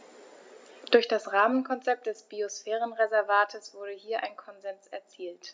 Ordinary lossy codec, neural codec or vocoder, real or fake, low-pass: none; none; real; none